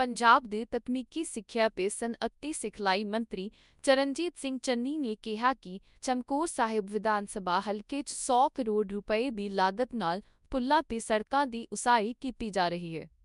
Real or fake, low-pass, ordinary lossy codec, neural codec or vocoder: fake; 10.8 kHz; none; codec, 24 kHz, 0.9 kbps, WavTokenizer, large speech release